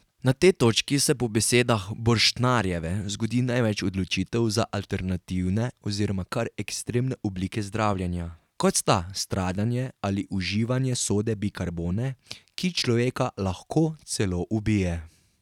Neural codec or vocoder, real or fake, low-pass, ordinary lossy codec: none; real; 19.8 kHz; none